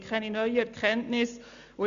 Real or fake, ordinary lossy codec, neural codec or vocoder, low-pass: real; AAC, 64 kbps; none; 7.2 kHz